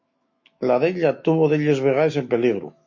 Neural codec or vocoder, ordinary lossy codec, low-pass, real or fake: autoencoder, 48 kHz, 128 numbers a frame, DAC-VAE, trained on Japanese speech; MP3, 32 kbps; 7.2 kHz; fake